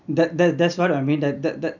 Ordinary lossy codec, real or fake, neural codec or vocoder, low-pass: none; real; none; 7.2 kHz